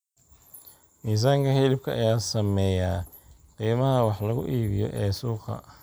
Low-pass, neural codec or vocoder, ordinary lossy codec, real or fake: none; none; none; real